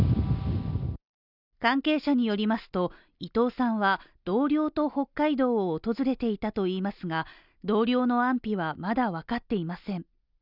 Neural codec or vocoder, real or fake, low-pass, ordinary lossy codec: none; real; 5.4 kHz; none